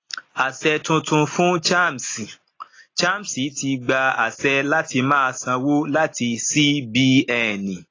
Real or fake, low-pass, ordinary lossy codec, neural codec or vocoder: real; 7.2 kHz; AAC, 32 kbps; none